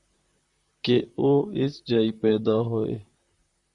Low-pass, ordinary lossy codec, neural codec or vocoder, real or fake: 10.8 kHz; Opus, 64 kbps; vocoder, 44.1 kHz, 128 mel bands, Pupu-Vocoder; fake